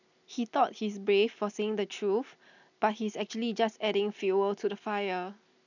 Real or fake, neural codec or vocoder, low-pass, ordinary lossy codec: real; none; 7.2 kHz; none